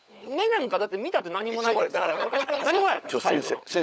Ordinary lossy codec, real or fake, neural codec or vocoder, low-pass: none; fake; codec, 16 kHz, 16 kbps, FunCodec, trained on LibriTTS, 50 frames a second; none